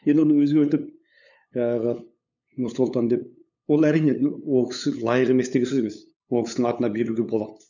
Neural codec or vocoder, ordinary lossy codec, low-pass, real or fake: codec, 16 kHz, 8 kbps, FunCodec, trained on LibriTTS, 25 frames a second; none; 7.2 kHz; fake